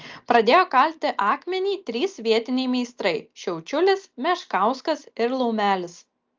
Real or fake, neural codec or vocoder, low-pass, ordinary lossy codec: real; none; 7.2 kHz; Opus, 24 kbps